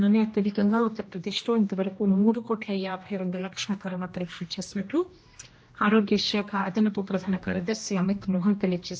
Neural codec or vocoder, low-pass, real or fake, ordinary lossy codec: codec, 16 kHz, 1 kbps, X-Codec, HuBERT features, trained on general audio; none; fake; none